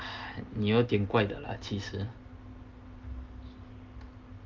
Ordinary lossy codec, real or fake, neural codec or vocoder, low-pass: Opus, 24 kbps; real; none; 7.2 kHz